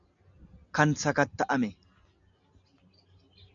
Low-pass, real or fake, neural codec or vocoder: 7.2 kHz; real; none